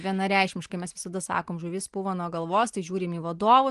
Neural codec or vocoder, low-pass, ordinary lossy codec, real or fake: none; 10.8 kHz; Opus, 24 kbps; real